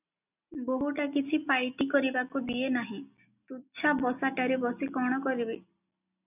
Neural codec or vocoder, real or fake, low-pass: none; real; 3.6 kHz